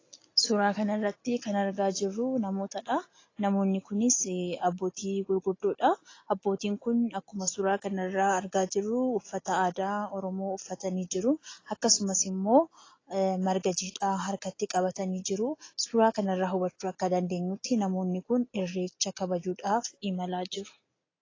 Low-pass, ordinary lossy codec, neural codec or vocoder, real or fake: 7.2 kHz; AAC, 32 kbps; none; real